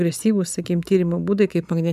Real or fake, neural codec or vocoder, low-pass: real; none; 14.4 kHz